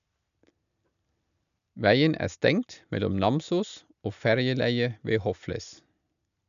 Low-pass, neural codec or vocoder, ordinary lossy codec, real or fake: 7.2 kHz; none; none; real